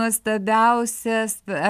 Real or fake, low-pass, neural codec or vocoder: real; 14.4 kHz; none